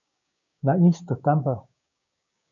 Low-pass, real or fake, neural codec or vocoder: 7.2 kHz; fake; codec, 16 kHz, 6 kbps, DAC